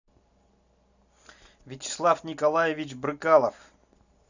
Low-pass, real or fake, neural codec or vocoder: 7.2 kHz; real; none